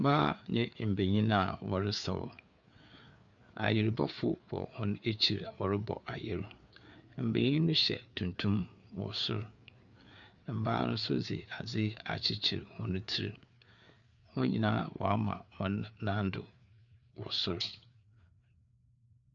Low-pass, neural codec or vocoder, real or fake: 7.2 kHz; codec, 16 kHz, 4 kbps, FreqCodec, larger model; fake